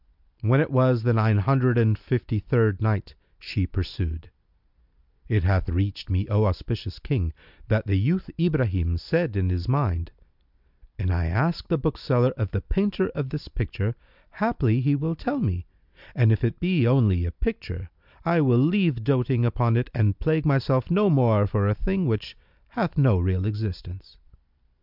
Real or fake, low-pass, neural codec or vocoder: real; 5.4 kHz; none